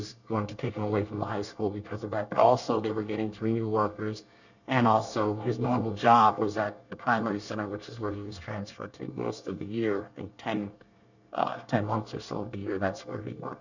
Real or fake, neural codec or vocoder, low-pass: fake; codec, 24 kHz, 1 kbps, SNAC; 7.2 kHz